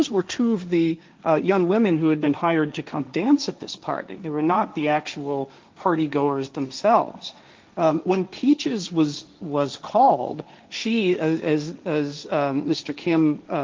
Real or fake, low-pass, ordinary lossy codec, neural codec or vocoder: fake; 7.2 kHz; Opus, 24 kbps; codec, 16 kHz, 1.1 kbps, Voila-Tokenizer